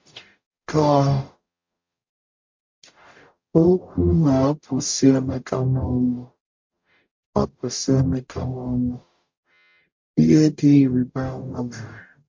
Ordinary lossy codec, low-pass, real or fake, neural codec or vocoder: MP3, 48 kbps; 7.2 kHz; fake; codec, 44.1 kHz, 0.9 kbps, DAC